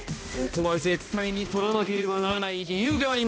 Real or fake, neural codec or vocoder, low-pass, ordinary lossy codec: fake; codec, 16 kHz, 1 kbps, X-Codec, HuBERT features, trained on balanced general audio; none; none